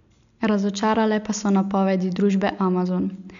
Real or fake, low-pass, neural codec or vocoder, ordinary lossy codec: real; 7.2 kHz; none; none